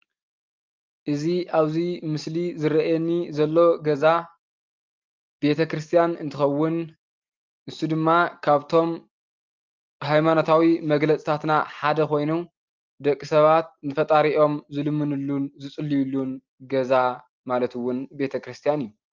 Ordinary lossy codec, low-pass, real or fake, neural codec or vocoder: Opus, 32 kbps; 7.2 kHz; real; none